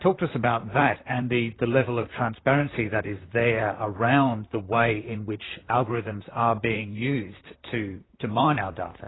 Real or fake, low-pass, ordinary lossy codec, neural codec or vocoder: fake; 7.2 kHz; AAC, 16 kbps; vocoder, 44.1 kHz, 128 mel bands, Pupu-Vocoder